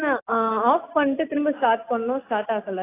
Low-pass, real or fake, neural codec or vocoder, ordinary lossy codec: 3.6 kHz; real; none; AAC, 24 kbps